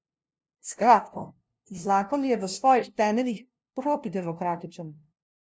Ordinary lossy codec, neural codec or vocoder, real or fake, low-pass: none; codec, 16 kHz, 0.5 kbps, FunCodec, trained on LibriTTS, 25 frames a second; fake; none